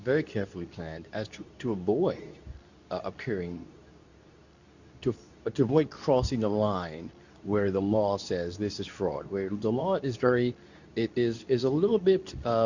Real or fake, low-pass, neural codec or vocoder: fake; 7.2 kHz; codec, 24 kHz, 0.9 kbps, WavTokenizer, medium speech release version 2